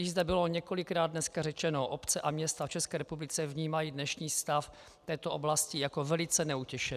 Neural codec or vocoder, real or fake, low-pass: none; real; 14.4 kHz